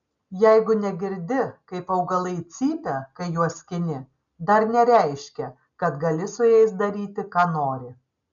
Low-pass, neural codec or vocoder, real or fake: 7.2 kHz; none; real